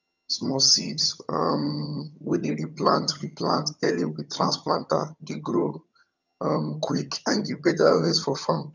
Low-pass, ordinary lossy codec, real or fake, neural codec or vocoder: 7.2 kHz; none; fake; vocoder, 22.05 kHz, 80 mel bands, HiFi-GAN